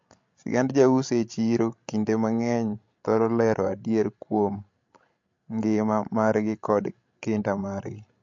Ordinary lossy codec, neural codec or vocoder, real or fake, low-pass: MP3, 48 kbps; codec, 16 kHz, 16 kbps, FreqCodec, larger model; fake; 7.2 kHz